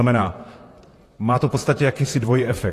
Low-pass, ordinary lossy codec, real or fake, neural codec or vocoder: 14.4 kHz; AAC, 48 kbps; fake; vocoder, 44.1 kHz, 128 mel bands, Pupu-Vocoder